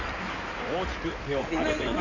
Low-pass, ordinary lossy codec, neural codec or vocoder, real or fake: 7.2 kHz; none; codec, 16 kHz in and 24 kHz out, 2.2 kbps, FireRedTTS-2 codec; fake